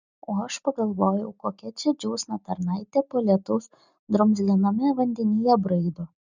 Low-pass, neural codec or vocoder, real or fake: 7.2 kHz; none; real